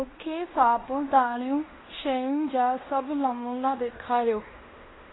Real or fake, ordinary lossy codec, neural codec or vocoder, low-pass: fake; AAC, 16 kbps; codec, 16 kHz in and 24 kHz out, 0.9 kbps, LongCat-Audio-Codec, fine tuned four codebook decoder; 7.2 kHz